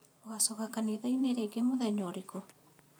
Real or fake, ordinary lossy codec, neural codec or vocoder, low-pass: fake; none; vocoder, 44.1 kHz, 128 mel bands every 512 samples, BigVGAN v2; none